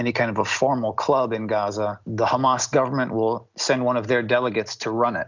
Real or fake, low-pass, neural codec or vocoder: real; 7.2 kHz; none